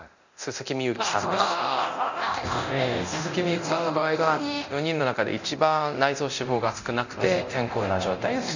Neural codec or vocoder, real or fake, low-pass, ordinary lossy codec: codec, 24 kHz, 0.9 kbps, DualCodec; fake; 7.2 kHz; none